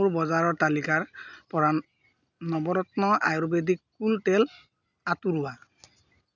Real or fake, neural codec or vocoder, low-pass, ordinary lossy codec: real; none; 7.2 kHz; none